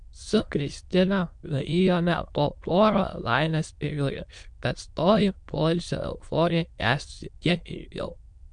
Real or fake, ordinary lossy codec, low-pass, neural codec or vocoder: fake; MP3, 64 kbps; 9.9 kHz; autoencoder, 22.05 kHz, a latent of 192 numbers a frame, VITS, trained on many speakers